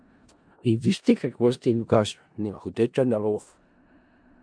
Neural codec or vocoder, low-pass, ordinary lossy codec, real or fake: codec, 16 kHz in and 24 kHz out, 0.4 kbps, LongCat-Audio-Codec, four codebook decoder; 9.9 kHz; MP3, 64 kbps; fake